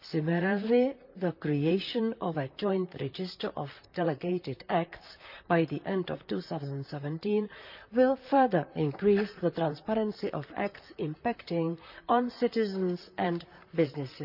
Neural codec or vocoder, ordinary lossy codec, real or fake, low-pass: vocoder, 44.1 kHz, 128 mel bands, Pupu-Vocoder; none; fake; 5.4 kHz